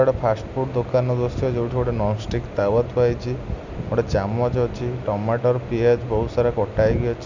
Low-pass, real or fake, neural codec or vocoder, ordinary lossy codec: 7.2 kHz; real; none; none